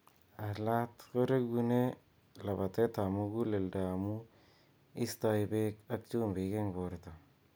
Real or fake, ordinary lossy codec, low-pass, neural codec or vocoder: real; none; none; none